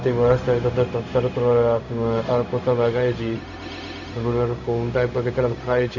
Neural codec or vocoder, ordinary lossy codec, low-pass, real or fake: codec, 16 kHz, 0.4 kbps, LongCat-Audio-Codec; none; 7.2 kHz; fake